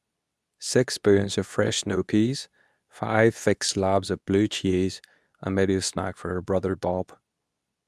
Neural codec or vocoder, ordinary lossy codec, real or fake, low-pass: codec, 24 kHz, 0.9 kbps, WavTokenizer, medium speech release version 2; none; fake; none